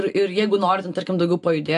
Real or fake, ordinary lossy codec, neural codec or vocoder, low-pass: real; AAC, 64 kbps; none; 10.8 kHz